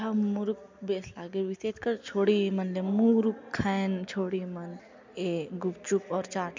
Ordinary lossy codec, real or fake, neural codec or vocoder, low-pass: MP3, 64 kbps; real; none; 7.2 kHz